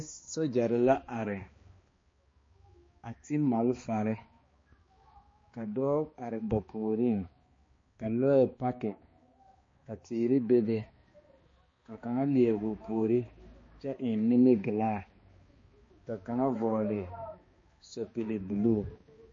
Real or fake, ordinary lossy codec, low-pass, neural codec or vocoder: fake; MP3, 32 kbps; 7.2 kHz; codec, 16 kHz, 2 kbps, X-Codec, HuBERT features, trained on balanced general audio